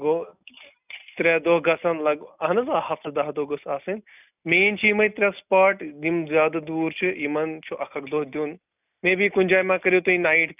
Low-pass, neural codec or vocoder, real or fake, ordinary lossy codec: 3.6 kHz; none; real; none